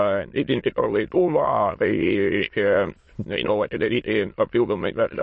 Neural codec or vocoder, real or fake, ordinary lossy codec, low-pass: autoencoder, 22.05 kHz, a latent of 192 numbers a frame, VITS, trained on many speakers; fake; MP3, 32 kbps; 9.9 kHz